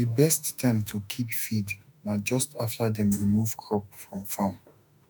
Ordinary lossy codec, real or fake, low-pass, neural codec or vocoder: none; fake; none; autoencoder, 48 kHz, 32 numbers a frame, DAC-VAE, trained on Japanese speech